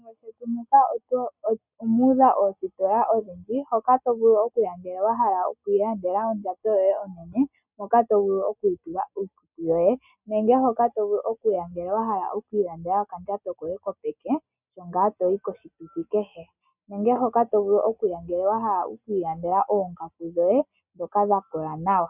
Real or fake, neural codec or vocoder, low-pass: real; none; 3.6 kHz